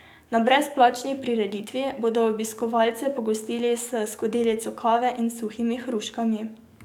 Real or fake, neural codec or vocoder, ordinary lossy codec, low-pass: fake; codec, 44.1 kHz, 7.8 kbps, DAC; none; 19.8 kHz